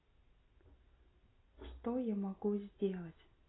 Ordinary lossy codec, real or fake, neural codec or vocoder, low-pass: AAC, 16 kbps; real; none; 7.2 kHz